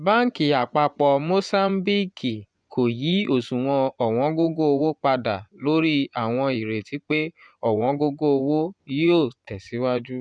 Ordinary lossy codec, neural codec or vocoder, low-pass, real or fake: none; vocoder, 24 kHz, 100 mel bands, Vocos; 9.9 kHz; fake